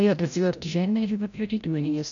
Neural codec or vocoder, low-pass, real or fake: codec, 16 kHz, 0.5 kbps, FreqCodec, larger model; 7.2 kHz; fake